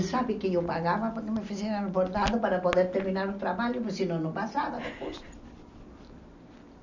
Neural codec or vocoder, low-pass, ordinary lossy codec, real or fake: none; 7.2 kHz; none; real